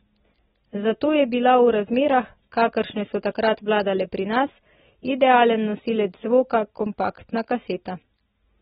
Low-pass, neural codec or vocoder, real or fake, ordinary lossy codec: 7.2 kHz; none; real; AAC, 16 kbps